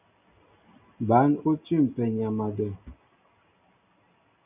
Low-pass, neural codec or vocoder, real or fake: 3.6 kHz; none; real